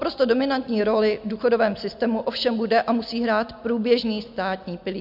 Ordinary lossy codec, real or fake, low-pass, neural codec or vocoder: MP3, 48 kbps; real; 5.4 kHz; none